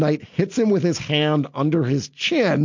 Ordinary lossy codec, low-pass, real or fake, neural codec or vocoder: MP3, 48 kbps; 7.2 kHz; real; none